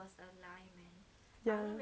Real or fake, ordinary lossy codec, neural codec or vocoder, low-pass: real; none; none; none